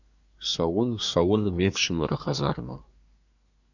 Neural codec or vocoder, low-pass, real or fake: codec, 24 kHz, 1 kbps, SNAC; 7.2 kHz; fake